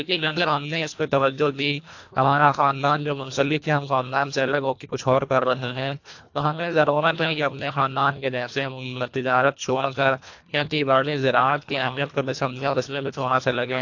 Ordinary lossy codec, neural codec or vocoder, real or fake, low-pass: AAC, 48 kbps; codec, 24 kHz, 1.5 kbps, HILCodec; fake; 7.2 kHz